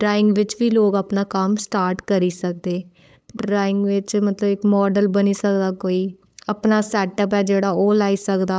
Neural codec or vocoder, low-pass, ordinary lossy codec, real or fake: codec, 16 kHz, 8 kbps, FunCodec, trained on LibriTTS, 25 frames a second; none; none; fake